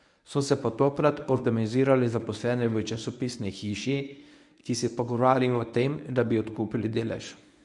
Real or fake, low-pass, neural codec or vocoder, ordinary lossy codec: fake; 10.8 kHz; codec, 24 kHz, 0.9 kbps, WavTokenizer, medium speech release version 1; none